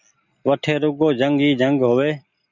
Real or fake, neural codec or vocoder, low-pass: real; none; 7.2 kHz